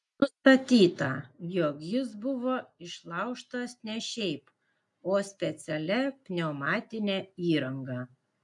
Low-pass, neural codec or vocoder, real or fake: 10.8 kHz; none; real